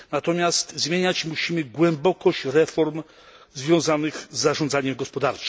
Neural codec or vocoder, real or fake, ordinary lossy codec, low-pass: none; real; none; none